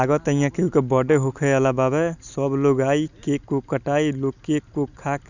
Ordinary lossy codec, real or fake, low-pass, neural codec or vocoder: none; real; 7.2 kHz; none